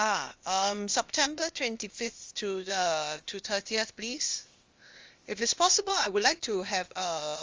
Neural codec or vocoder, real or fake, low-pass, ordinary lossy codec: codec, 16 kHz, 0.8 kbps, ZipCodec; fake; 7.2 kHz; Opus, 32 kbps